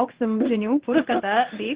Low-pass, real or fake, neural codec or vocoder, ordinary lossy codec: 3.6 kHz; fake; codec, 16 kHz in and 24 kHz out, 1 kbps, XY-Tokenizer; Opus, 16 kbps